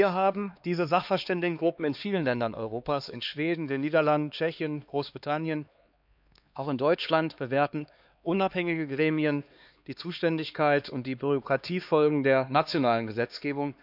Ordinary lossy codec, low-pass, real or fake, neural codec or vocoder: none; 5.4 kHz; fake; codec, 16 kHz, 2 kbps, X-Codec, HuBERT features, trained on LibriSpeech